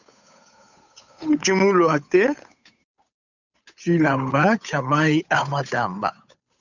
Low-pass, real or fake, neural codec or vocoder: 7.2 kHz; fake; codec, 16 kHz, 8 kbps, FunCodec, trained on Chinese and English, 25 frames a second